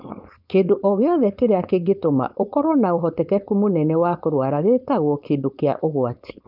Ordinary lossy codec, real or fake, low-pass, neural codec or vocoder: none; fake; 5.4 kHz; codec, 16 kHz, 4.8 kbps, FACodec